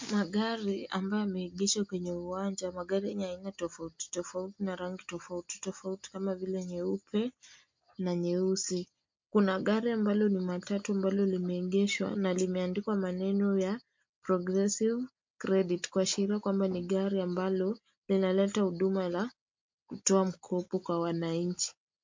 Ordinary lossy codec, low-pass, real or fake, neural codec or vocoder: MP3, 48 kbps; 7.2 kHz; real; none